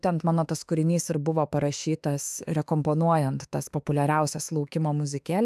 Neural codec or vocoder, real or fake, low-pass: autoencoder, 48 kHz, 32 numbers a frame, DAC-VAE, trained on Japanese speech; fake; 14.4 kHz